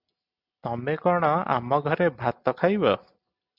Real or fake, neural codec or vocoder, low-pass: real; none; 5.4 kHz